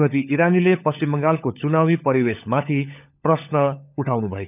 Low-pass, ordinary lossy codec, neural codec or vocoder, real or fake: 3.6 kHz; none; codec, 16 kHz, 16 kbps, FunCodec, trained on LibriTTS, 50 frames a second; fake